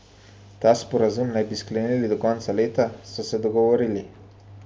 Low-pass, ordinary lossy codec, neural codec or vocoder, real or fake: none; none; none; real